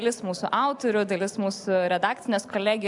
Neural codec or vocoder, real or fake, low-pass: none; real; 10.8 kHz